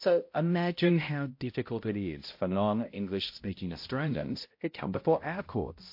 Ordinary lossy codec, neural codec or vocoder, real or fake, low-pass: MP3, 32 kbps; codec, 16 kHz, 0.5 kbps, X-Codec, HuBERT features, trained on balanced general audio; fake; 5.4 kHz